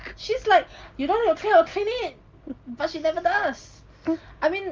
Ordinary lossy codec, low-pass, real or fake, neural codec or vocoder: Opus, 32 kbps; 7.2 kHz; real; none